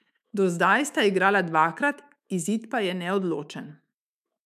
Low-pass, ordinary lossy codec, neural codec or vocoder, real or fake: 14.4 kHz; none; autoencoder, 48 kHz, 128 numbers a frame, DAC-VAE, trained on Japanese speech; fake